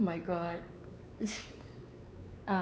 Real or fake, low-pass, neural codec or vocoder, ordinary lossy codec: fake; none; codec, 16 kHz, 4 kbps, X-Codec, WavLM features, trained on Multilingual LibriSpeech; none